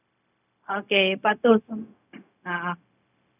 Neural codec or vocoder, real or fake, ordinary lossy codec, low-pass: codec, 16 kHz, 0.4 kbps, LongCat-Audio-Codec; fake; none; 3.6 kHz